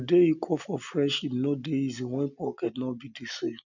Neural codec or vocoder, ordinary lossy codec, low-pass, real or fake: none; none; 7.2 kHz; real